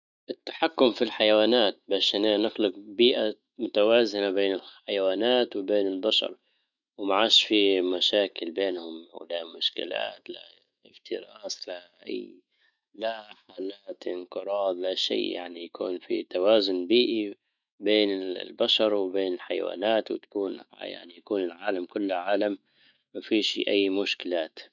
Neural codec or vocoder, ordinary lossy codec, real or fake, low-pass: autoencoder, 48 kHz, 128 numbers a frame, DAC-VAE, trained on Japanese speech; none; fake; 7.2 kHz